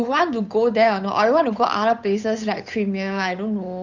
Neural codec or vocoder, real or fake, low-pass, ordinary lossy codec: codec, 16 kHz, 8 kbps, FunCodec, trained on Chinese and English, 25 frames a second; fake; 7.2 kHz; none